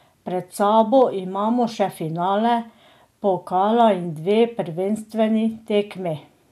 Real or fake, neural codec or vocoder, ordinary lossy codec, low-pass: real; none; none; 14.4 kHz